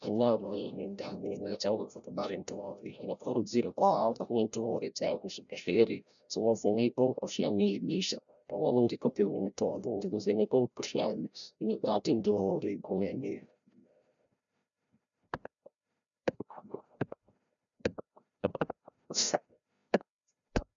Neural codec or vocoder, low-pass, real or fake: codec, 16 kHz, 0.5 kbps, FreqCodec, larger model; 7.2 kHz; fake